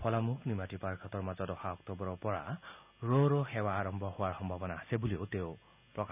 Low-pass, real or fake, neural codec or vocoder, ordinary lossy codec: 3.6 kHz; real; none; none